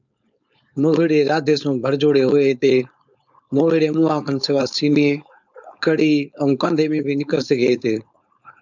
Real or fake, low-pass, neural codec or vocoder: fake; 7.2 kHz; codec, 16 kHz, 4.8 kbps, FACodec